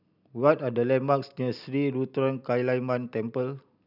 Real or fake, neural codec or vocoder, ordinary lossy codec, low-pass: real; none; none; 5.4 kHz